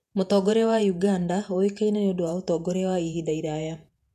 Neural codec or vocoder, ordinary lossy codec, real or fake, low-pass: vocoder, 48 kHz, 128 mel bands, Vocos; none; fake; 14.4 kHz